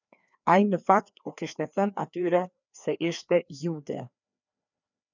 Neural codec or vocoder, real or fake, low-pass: codec, 16 kHz, 2 kbps, FreqCodec, larger model; fake; 7.2 kHz